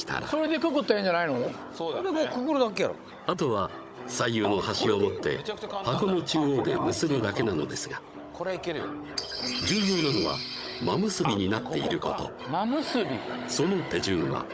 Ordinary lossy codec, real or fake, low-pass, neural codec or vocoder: none; fake; none; codec, 16 kHz, 16 kbps, FunCodec, trained on Chinese and English, 50 frames a second